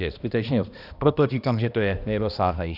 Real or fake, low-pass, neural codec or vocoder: fake; 5.4 kHz; codec, 16 kHz, 1 kbps, X-Codec, HuBERT features, trained on balanced general audio